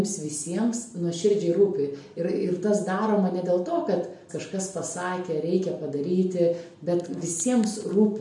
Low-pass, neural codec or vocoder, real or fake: 10.8 kHz; none; real